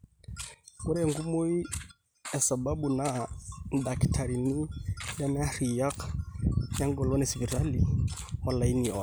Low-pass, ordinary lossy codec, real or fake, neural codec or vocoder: none; none; real; none